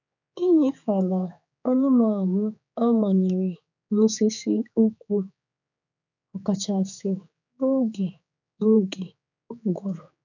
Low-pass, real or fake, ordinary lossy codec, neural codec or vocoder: 7.2 kHz; fake; none; codec, 16 kHz, 4 kbps, X-Codec, HuBERT features, trained on general audio